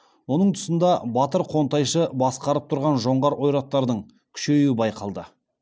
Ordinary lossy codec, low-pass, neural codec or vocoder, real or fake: none; none; none; real